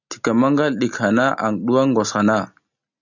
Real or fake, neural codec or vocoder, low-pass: real; none; 7.2 kHz